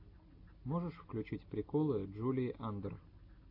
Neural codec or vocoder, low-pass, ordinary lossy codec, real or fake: none; 5.4 kHz; AAC, 48 kbps; real